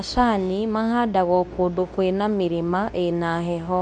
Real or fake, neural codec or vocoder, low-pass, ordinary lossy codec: fake; codec, 24 kHz, 0.9 kbps, WavTokenizer, medium speech release version 2; none; none